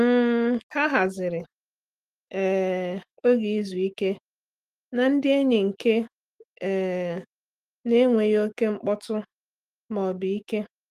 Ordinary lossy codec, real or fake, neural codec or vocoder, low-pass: Opus, 24 kbps; fake; autoencoder, 48 kHz, 128 numbers a frame, DAC-VAE, trained on Japanese speech; 14.4 kHz